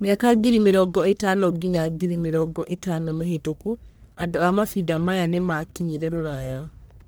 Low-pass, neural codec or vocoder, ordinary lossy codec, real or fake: none; codec, 44.1 kHz, 1.7 kbps, Pupu-Codec; none; fake